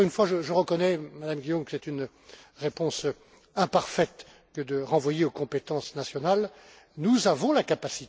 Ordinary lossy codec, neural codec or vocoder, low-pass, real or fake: none; none; none; real